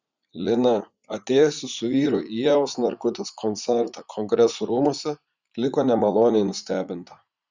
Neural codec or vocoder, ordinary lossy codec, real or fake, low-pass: vocoder, 44.1 kHz, 80 mel bands, Vocos; Opus, 64 kbps; fake; 7.2 kHz